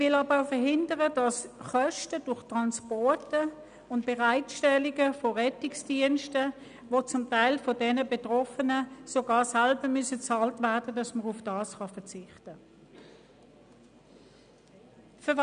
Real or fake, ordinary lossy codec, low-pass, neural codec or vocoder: real; none; 9.9 kHz; none